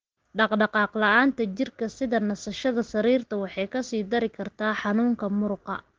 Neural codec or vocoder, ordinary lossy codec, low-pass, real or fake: none; Opus, 16 kbps; 7.2 kHz; real